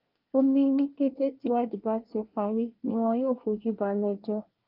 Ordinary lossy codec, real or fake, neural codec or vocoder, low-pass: Opus, 32 kbps; fake; codec, 24 kHz, 1 kbps, SNAC; 5.4 kHz